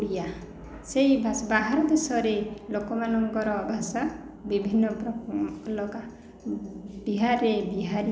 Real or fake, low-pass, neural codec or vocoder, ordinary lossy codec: real; none; none; none